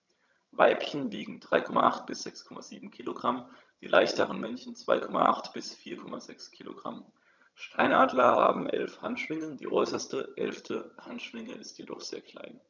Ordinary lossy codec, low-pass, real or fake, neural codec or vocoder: none; 7.2 kHz; fake; vocoder, 22.05 kHz, 80 mel bands, HiFi-GAN